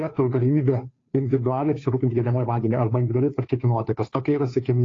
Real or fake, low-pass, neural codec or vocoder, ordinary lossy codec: fake; 7.2 kHz; codec, 16 kHz, 2 kbps, FunCodec, trained on Chinese and English, 25 frames a second; AAC, 32 kbps